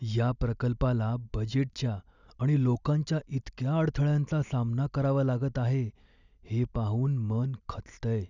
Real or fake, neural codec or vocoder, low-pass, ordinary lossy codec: real; none; 7.2 kHz; none